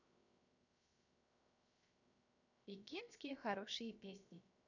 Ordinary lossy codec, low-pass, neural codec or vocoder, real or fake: none; 7.2 kHz; codec, 16 kHz, 0.7 kbps, FocalCodec; fake